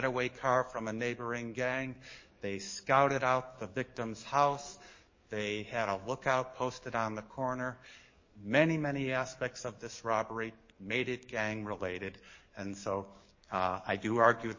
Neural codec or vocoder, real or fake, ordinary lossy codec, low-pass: codec, 44.1 kHz, 7.8 kbps, DAC; fake; MP3, 32 kbps; 7.2 kHz